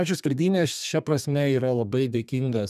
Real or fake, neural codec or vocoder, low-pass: fake; codec, 32 kHz, 1.9 kbps, SNAC; 14.4 kHz